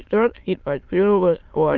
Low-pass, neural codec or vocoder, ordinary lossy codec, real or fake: 7.2 kHz; autoencoder, 22.05 kHz, a latent of 192 numbers a frame, VITS, trained on many speakers; Opus, 24 kbps; fake